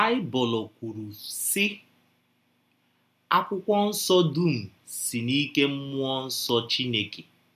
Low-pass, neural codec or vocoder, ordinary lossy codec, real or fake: 14.4 kHz; none; none; real